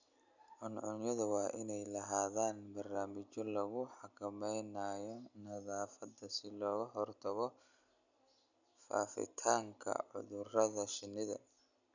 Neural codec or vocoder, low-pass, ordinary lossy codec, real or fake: none; 7.2 kHz; none; real